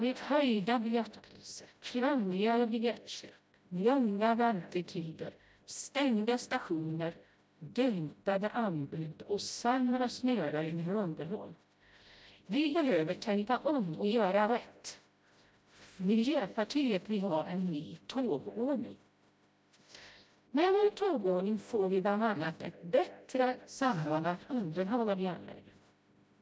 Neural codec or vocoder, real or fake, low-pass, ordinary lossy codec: codec, 16 kHz, 0.5 kbps, FreqCodec, smaller model; fake; none; none